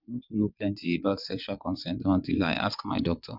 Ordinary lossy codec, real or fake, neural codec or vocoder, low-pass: none; fake; vocoder, 22.05 kHz, 80 mel bands, WaveNeXt; 5.4 kHz